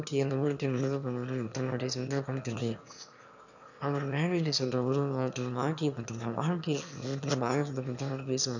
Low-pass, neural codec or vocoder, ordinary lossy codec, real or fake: 7.2 kHz; autoencoder, 22.05 kHz, a latent of 192 numbers a frame, VITS, trained on one speaker; none; fake